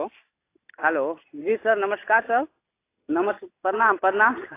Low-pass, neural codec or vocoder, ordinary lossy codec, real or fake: 3.6 kHz; none; AAC, 24 kbps; real